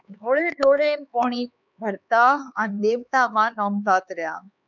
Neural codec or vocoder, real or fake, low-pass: codec, 16 kHz, 4 kbps, X-Codec, HuBERT features, trained on LibriSpeech; fake; 7.2 kHz